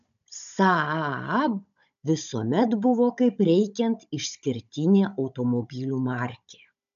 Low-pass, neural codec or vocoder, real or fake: 7.2 kHz; codec, 16 kHz, 16 kbps, FunCodec, trained on Chinese and English, 50 frames a second; fake